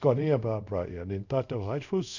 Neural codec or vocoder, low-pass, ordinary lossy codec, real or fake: codec, 24 kHz, 0.5 kbps, DualCodec; 7.2 kHz; none; fake